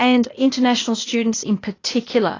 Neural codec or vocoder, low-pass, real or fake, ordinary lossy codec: codec, 16 kHz, 0.8 kbps, ZipCodec; 7.2 kHz; fake; AAC, 32 kbps